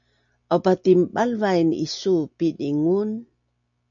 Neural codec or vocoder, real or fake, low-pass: none; real; 7.2 kHz